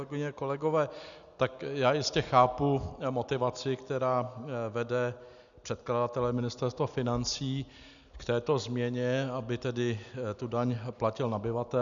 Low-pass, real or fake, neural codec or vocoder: 7.2 kHz; real; none